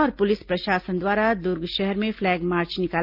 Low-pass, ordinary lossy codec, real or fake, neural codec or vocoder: 5.4 kHz; Opus, 24 kbps; real; none